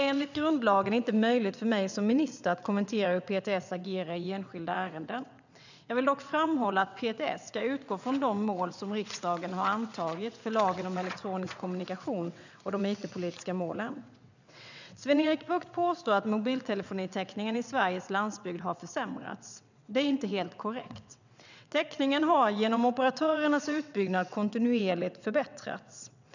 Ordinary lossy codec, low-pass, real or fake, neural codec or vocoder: none; 7.2 kHz; fake; vocoder, 22.05 kHz, 80 mel bands, WaveNeXt